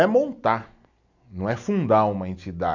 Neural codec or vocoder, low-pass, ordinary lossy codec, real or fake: none; 7.2 kHz; none; real